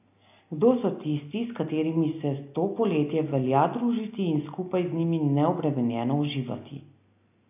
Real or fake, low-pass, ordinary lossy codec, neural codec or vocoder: real; 3.6 kHz; AAC, 32 kbps; none